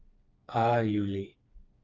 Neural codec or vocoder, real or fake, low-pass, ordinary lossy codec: codec, 16 kHz, 4 kbps, FreqCodec, smaller model; fake; 7.2 kHz; Opus, 24 kbps